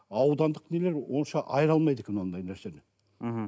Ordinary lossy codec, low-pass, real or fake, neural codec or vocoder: none; none; real; none